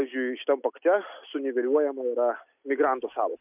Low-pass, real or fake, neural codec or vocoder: 3.6 kHz; real; none